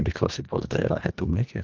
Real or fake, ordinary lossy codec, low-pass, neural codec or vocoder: fake; Opus, 32 kbps; 7.2 kHz; codec, 24 kHz, 1.5 kbps, HILCodec